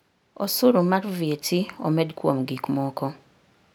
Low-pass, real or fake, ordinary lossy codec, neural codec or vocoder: none; real; none; none